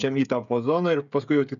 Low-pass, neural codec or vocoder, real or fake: 7.2 kHz; codec, 16 kHz, 16 kbps, FreqCodec, smaller model; fake